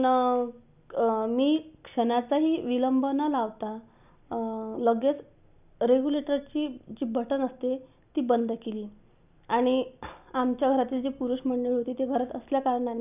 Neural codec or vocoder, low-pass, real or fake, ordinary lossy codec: none; 3.6 kHz; real; none